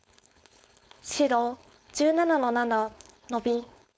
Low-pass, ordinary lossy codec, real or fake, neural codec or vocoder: none; none; fake; codec, 16 kHz, 4.8 kbps, FACodec